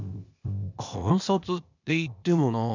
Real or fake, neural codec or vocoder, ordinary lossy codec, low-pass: fake; codec, 16 kHz, 0.8 kbps, ZipCodec; none; 7.2 kHz